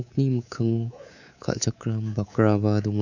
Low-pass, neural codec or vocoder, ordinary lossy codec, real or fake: 7.2 kHz; codec, 24 kHz, 3.1 kbps, DualCodec; none; fake